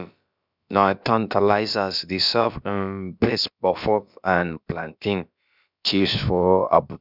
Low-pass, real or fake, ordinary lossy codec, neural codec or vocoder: 5.4 kHz; fake; none; codec, 16 kHz, about 1 kbps, DyCAST, with the encoder's durations